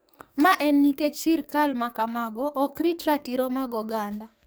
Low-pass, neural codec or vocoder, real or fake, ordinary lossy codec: none; codec, 44.1 kHz, 2.6 kbps, SNAC; fake; none